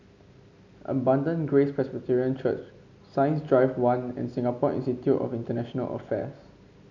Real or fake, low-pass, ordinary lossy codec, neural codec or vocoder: real; 7.2 kHz; none; none